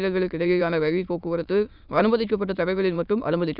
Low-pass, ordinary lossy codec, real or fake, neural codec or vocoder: 5.4 kHz; none; fake; autoencoder, 22.05 kHz, a latent of 192 numbers a frame, VITS, trained on many speakers